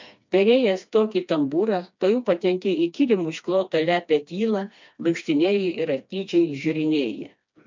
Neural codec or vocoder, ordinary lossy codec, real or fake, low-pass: codec, 16 kHz, 2 kbps, FreqCodec, smaller model; MP3, 64 kbps; fake; 7.2 kHz